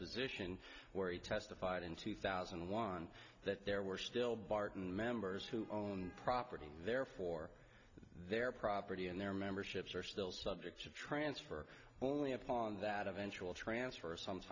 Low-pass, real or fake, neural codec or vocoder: 7.2 kHz; real; none